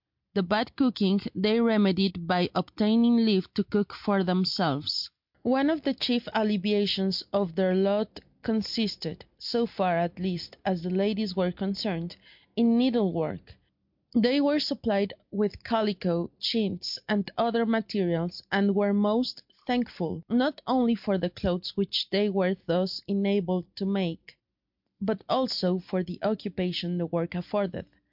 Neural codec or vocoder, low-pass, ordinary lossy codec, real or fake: none; 5.4 kHz; MP3, 48 kbps; real